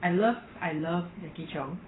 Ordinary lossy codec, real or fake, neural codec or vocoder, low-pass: AAC, 16 kbps; fake; vocoder, 44.1 kHz, 128 mel bands every 512 samples, BigVGAN v2; 7.2 kHz